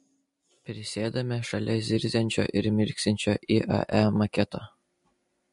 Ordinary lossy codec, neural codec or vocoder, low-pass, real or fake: MP3, 48 kbps; none; 14.4 kHz; real